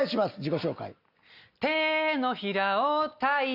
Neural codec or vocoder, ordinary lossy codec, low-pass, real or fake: none; Opus, 64 kbps; 5.4 kHz; real